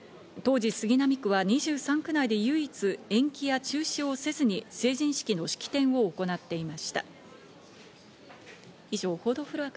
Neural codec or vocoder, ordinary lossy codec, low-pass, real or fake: none; none; none; real